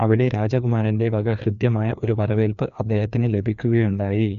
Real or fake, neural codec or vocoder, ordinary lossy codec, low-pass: fake; codec, 16 kHz, 2 kbps, FreqCodec, larger model; none; 7.2 kHz